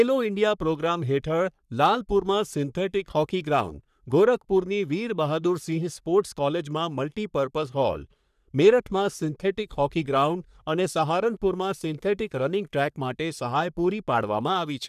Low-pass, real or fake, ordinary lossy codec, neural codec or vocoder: 14.4 kHz; fake; none; codec, 44.1 kHz, 3.4 kbps, Pupu-Codec